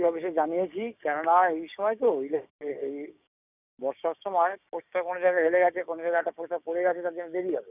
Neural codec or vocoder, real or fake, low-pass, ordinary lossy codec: codec, 16 kHz, 6 kbps, DAC; fake; 3.6 kHz; none